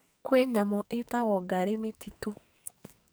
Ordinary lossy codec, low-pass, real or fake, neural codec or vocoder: none; none; fake; codec, 44.1 kHz, 2.6 kbps, SNAC